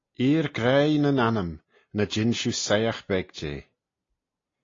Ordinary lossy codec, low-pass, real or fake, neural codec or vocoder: AAC, 32 kbps; 7.2 kHz; real; none